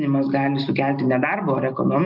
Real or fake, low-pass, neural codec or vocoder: real; 5.4 kHz; none